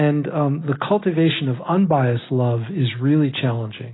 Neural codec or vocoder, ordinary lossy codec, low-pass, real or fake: none; AAC, 16 kbps; 7.2 kHz; real